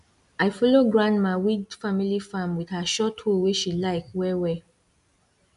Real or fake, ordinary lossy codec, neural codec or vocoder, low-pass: real; none; none; 10.8 kHz